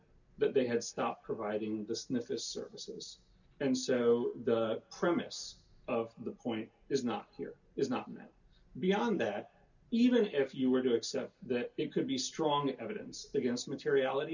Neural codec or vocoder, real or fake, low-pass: none; real; 7.2 kHz